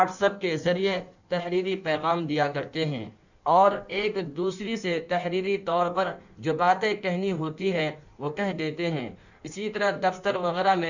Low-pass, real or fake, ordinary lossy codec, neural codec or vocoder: 7.2 kHz; fake; none; codec, 16 kHz in and 24 kHz out, 1.1 kbps, FireRedTTS-2 codec